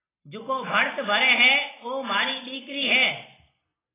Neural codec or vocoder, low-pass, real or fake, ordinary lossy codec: none; 3.6 kHz; real; AAC, 16 kbps